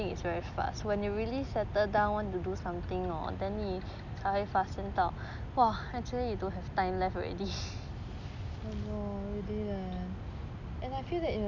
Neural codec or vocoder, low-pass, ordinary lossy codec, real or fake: none; 7.2 kHz; none; real